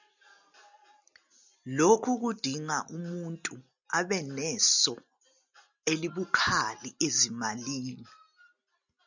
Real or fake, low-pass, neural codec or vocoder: real; 7.2 kHz; none